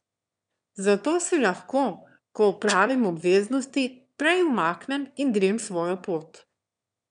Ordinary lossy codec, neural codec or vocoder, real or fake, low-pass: none; autoencoder, 22.05 kHz, a latent of 192 numbers a frame, VITS, trained on one speaker; fake; 9.9 kHz